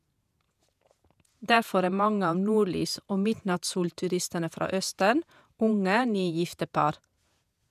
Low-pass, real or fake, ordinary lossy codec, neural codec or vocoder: 14.4 kHz; fake; none; vocoder, 48 kHz, 128 mel bands, Vocos